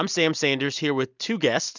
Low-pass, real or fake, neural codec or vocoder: 7.2 kHz; fake; vocoder, 44.1 kHz, 128 mel bands every 256 samples, BigVGAN v2